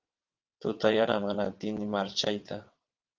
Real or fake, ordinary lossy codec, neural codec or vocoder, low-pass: fake; Opus, 32 kbps; vocoder, 22.05 kHz, 80 mel bands, WaveNeXt; 7.2 kHz